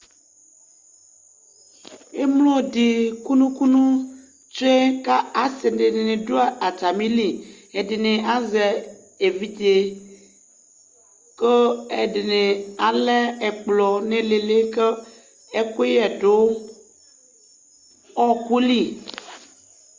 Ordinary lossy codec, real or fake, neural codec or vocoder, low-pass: Opus, 32 kbps; real; none; 7.2 kHz